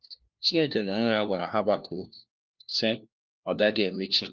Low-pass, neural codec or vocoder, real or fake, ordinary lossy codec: 7.2 kHz; codec, 16 kHz, 1 kbps, FunCodec, trained on LibriTTS, 50 frames a second; fake; Opus, 32 kbps